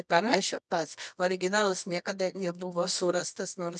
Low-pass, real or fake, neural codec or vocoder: 10.8 kHz; fake; codec, 24 kHz, 0.9 kbps, WavTokenizer, medium music audio release